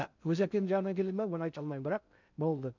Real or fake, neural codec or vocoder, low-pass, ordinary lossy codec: fake; codec, 16 kHz in and 24 kHz out, 0.6 kbps, FocalCodec, streaming, 2048 codes; 7.2 kHz; none